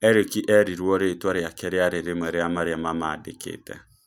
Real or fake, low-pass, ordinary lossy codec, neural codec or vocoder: real; 19.8 kHz; none; none